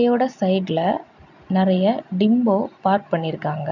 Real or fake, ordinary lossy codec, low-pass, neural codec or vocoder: real; none; 7.2 kHz; none